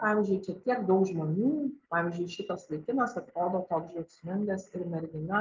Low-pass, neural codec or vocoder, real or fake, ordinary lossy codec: 7.2 kHz; none; real; Opus, 24 kbps